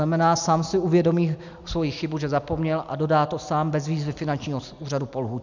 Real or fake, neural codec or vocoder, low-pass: real; none; 7.2 kHz